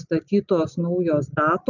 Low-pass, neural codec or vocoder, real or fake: 7.2 kHz; none; real